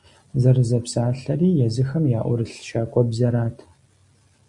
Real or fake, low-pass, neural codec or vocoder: real; 10.8 kHz; none